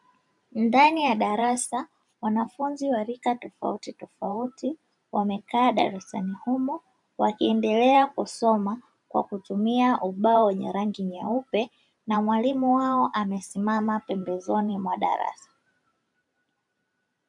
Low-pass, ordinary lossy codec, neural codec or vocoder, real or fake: 10.8 kHz; MP3, 96 kbps; vocoder, 44.1 kHz, 128 mel bands every 512 samples, BigVGAN v2; fake